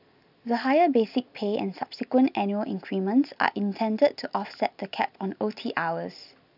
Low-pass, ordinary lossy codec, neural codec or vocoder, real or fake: 5.4 kHz; none; none; real